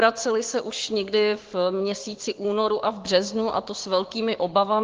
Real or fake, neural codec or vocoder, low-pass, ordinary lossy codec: fake; codec, 16 kHz, 6 kbps, DAC; 7.2 kHz; Opus, 16 kbps